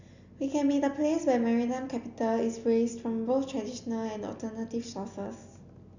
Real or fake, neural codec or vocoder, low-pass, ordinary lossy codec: real; none; 7.2 kHz; none